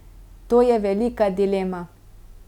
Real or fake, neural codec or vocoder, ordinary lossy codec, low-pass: real; none; none; 19.8 kHz